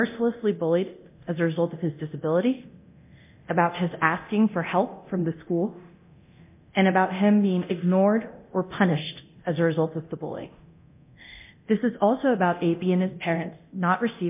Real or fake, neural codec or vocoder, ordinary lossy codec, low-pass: fake; codec, 24 kHz, 0.9 kbps, DualCodec; MP3, 32 kbps; 3.6 kHz